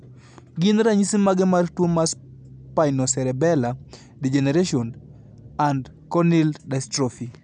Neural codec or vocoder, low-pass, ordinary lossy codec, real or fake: none; 9.9 kHz; none; real